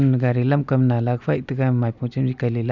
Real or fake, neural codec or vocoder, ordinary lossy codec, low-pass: real; none; none; 7.2 kHz